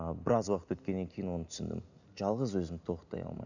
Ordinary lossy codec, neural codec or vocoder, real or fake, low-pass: none; none; real; 7.2 kHz